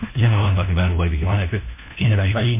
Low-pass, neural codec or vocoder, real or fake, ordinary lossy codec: 3.6 kHz; codec, 16 kHz, 1 kbps, FunCodec, trained on LibriTTS, 50 frames a second; fake; MP3, 24 kbps